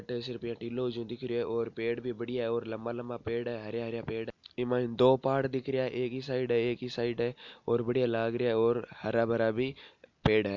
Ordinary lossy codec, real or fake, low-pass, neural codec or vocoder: MP3, 64 kbps; real; 7.2 kHz; none